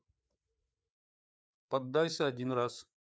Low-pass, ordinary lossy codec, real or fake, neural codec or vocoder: none; none; fake; codec, 16 kHz, 4 kbps, FreqCodec, larger model